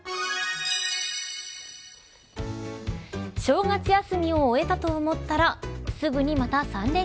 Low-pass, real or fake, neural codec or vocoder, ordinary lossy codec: none; real; none; none